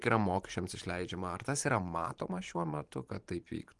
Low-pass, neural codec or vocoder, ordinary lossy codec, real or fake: 10.8 kHz; vocoder, 44.1 kHz, 128 mel bands every 512 samples, BigVGAN v2; Opus, 24 kbps; fake